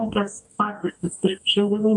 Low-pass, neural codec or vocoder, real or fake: 10.8 kHz; codec, 44.1 kHz, 2.6 kbps, DAC; fake